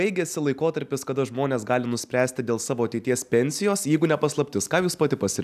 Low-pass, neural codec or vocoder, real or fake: 14.4 kHz; none; real